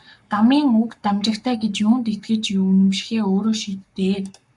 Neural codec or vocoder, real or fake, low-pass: codec, 44.1 kHz, 7.8 kbps, Pupu-Codec; fake; 10.8 kHz